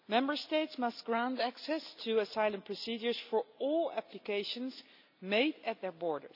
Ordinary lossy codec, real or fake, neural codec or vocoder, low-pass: none; real; none; 5.4 kHz